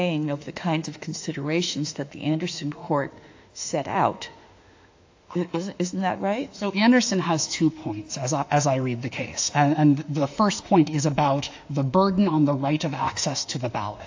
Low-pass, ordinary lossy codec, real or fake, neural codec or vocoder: 7.2 kHz; AAC, 48 kbps; fake; autoencoder, 48 kHz, 32 numbers a frame, DAC-VAE, trained on Japanese speech